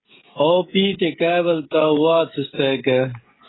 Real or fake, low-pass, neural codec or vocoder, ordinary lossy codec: fake; 7.2 kHz; codec, 16 kHz, 16 kbps, FreqCodec, smaller model; AAC, 16 kbps